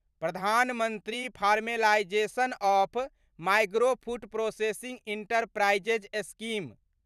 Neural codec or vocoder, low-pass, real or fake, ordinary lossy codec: vocoder, 44.1 kHz, 128 mel bands every 512 samples, BigVGAN v2; 14.4 kHz; fake; none